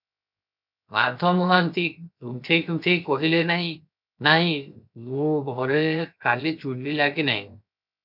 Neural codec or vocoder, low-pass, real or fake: codec, 16 kHz, 0.7 kbps, FocalCodec; 5.4 kHz; fake